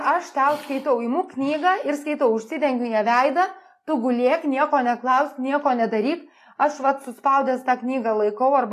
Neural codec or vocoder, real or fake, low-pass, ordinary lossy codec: none; real; 14.4 kHz; AAC, 48 kbps